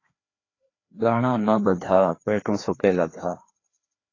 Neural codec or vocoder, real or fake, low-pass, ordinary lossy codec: codec, 16 kHz, 2 kbps, FreqCodec, larger model; fake; 7.2 kHz; AAC, 32 kbps